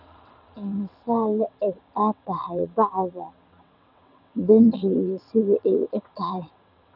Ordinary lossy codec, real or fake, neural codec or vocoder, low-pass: none; fake; vocoder, 22.05 kHz, 80 mel bands, WaveNeXt; 5.4 kHz